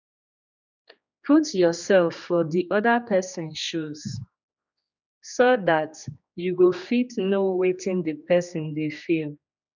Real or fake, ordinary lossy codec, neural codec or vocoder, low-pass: fake; none; codec, 16 kHz, 2 kbps, X-Codec, HuBERT features, trained on general audio; 7.2 kHz